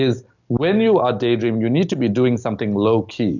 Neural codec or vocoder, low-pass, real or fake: none; 7.2 kHz; real